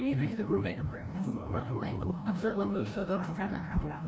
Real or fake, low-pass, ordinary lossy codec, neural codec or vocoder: fake; none; none; codec, 16 kHz, 0.5 kbps, FreqCodec, larger model